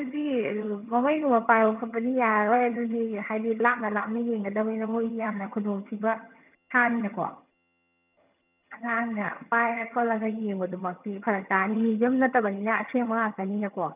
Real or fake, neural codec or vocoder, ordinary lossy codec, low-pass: fake; vocoder, 22.05 kHz, 80 mel bands, HiFi-GAN; none; 3.6 kHz